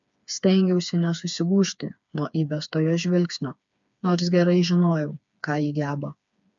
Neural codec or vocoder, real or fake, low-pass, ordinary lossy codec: codec, 16 kHz, 4 kbps, FreqCodec, smaller model; fake; 7.2 kHz; MP3, 64 kbps